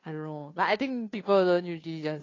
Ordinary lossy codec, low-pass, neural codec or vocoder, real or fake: AAC, 32 kbps; 7.2 kHz; codec, 16 kHz, 2 kbps, FunCodec, trained on Chinese and English, 25 frames a second; fake